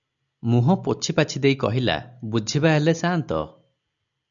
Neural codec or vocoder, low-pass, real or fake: none; 7.2 kHz; real